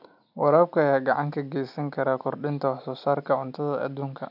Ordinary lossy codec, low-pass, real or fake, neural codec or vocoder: AAC, 48 kbps; 5.4 kHz; real; none